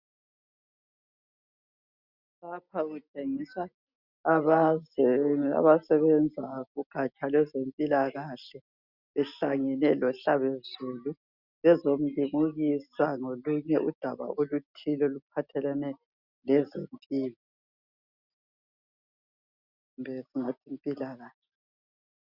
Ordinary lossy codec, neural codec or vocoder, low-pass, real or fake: Opus, 64 kbps; none; 5.4 kHz; real